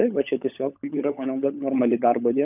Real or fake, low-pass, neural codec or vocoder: fake; 3.6 kHz; codec, 16 kHz, 16 kbps, FunCodec, trained on LibriTTS, 50 frames a second